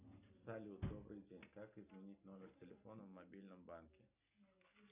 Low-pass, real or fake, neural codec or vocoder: 3.6 kHz; real; none